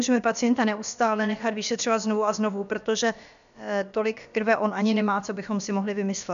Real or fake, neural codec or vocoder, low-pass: fake; codec, 16 kHz, about 1 kbps, DyCAST, with the encoder's durations; 7.2 kHz